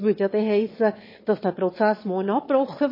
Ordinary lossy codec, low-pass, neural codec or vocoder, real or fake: MP3, 24 kbps; 5.4 kHz; autoencoder, 22.05 kHz, a latent of 192 numbers a frame, VITS, trained on one speaker; fake